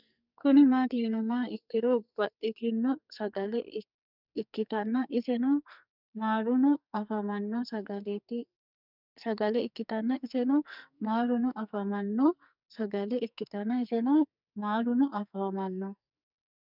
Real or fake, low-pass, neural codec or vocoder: fake; 5.4 kHz; codec, 44.1 kHz, 2.6 kbps, SNAC